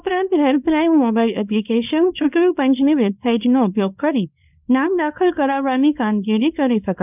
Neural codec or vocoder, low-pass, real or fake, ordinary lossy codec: codec, 24 kHz, 0.9 kbps, WavTokenizer, small release; 3.6 kHz; fake; none